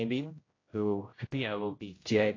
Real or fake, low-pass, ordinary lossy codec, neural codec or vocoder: fake; 7.2 kHz; AAC, 32 kbps; codec, 16 kHz, 0.5 kbps, X-Codec, HuBERT features, trained on general audio